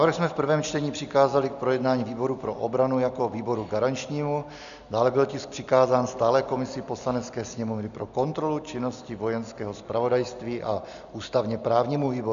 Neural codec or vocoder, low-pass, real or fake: none; 7.2 kHz; real